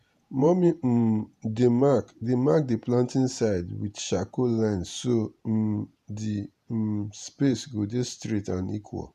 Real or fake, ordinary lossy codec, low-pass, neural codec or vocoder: fake; none; 14.4 kHz; vocoder, 48 kHz, 128 mel bands, Vocos